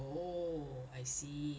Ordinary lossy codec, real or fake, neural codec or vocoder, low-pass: none; real; none; none